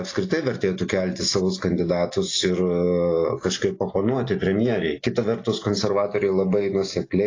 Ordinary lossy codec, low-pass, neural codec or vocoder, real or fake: AAC, 32 kbps; 7.2 kHz; none; real